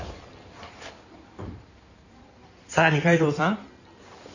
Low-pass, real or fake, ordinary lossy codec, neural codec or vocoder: 7.2 kHz; fake; none; codec, 16 kHz in and 24 kHz out, 2.2 kbps, FireRedTTS-2 codec